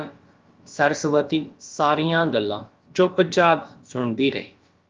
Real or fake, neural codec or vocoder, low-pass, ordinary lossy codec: fake; codec, 16 kHz, about 1 kbps, DyCAST, with the encoder's durations; 7.2 kHz; Opus, 32 kbps